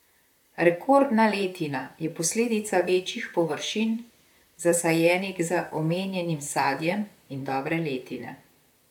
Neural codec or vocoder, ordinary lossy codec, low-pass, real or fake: vocoder, 44.1 kHz, 128 mel bands, Pupu-Vocoder; none; 19.8 kHz; fake